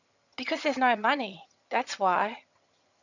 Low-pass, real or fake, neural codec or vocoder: 7.2 kHz; fake; vocoder, 22.05 kHz, 80 mel bands, HiFi-GAN